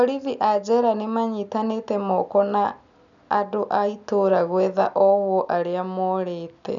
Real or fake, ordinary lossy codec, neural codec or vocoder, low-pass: real; none; none; 7.2 kHz